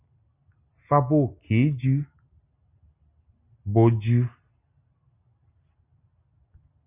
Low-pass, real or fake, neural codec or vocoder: 3.6 kHz; real; none